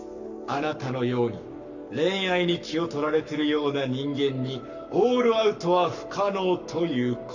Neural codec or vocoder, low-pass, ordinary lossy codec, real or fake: codec, 44.1 kHz, 7.8 kbps, Pupu-Codec; 7.2 kHz; none; fake